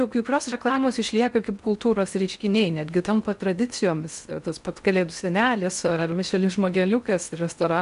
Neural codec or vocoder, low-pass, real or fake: codec, 16 kHz in and 24 kHz out, 0.6 kbps, FocalCodec, streaming, 4096 codes; 10.8 kHz; fake